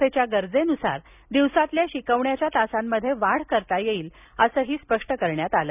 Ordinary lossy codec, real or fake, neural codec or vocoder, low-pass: none; real; none; 3.6 kHz